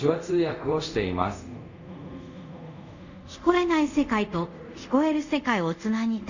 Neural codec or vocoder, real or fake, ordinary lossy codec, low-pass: codec, 24 kHz, 0.5 kbps, DualCodec; fake; Opus, 64 kbps; 7.2 kHz